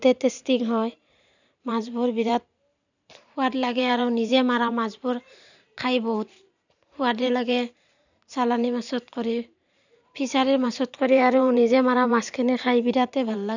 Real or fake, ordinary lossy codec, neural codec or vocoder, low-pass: fake; none; vocoder, 44.1 kHz, 128 mel bands every 512 samples, BigVGAN v2; 7.2 kHz